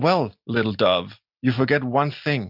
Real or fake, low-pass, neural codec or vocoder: real; 5.4 kHz; none